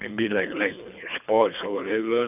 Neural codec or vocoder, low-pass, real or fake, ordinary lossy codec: codec, 24 kHz, 3 kbps, HILCodec; 3.6 kHz; fake; none